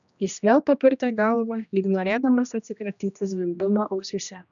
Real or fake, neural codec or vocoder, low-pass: fake; codec, 16 kHz, 1 kbps, X-Codec, HuBERT features, trained on general audio; 7.2 kHz